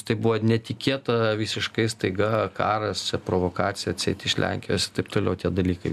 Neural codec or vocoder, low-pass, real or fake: none; 14.4 kHz; real